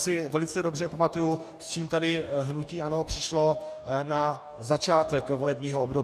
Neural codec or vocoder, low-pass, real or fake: codec, 44.1 kHz, 2.6 kbps, DAC; 14.4 kHz; fake